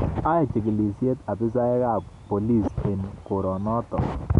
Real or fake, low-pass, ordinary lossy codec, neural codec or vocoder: real; 10.8 kHz; none; none